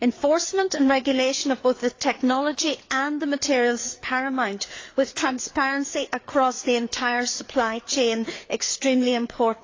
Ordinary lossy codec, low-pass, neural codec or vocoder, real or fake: AAC, 32 kbps; 7.2 kHz; codec, 16 kHz, 4 kbps, FreqCodec, larger model; fake